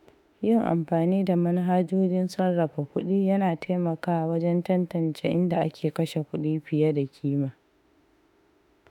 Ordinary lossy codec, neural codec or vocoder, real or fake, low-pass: none; autoencoder, 48 kHz, 32 numbers a frame, DAC-VAE, trained on Japanese speech; fake; 19.8 kHz